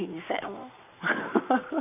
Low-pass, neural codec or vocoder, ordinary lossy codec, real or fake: 3.6 kHz; codec, 44.1 kHz, 7.8 kbps, Pupu-Codec; none; fake